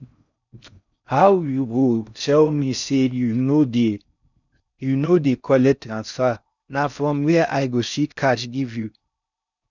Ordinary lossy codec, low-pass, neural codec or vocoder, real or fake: none; 7.2 kHz; codec, 16 kHz in and 24 kHz out, 0.6 kbps, FocalCodec, streaming, 4096 codes; fake